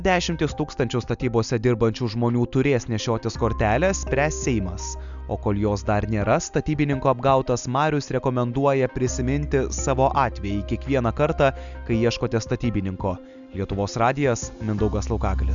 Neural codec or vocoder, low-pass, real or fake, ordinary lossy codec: none; 7.2 kHz; real; MP3, 96 kbps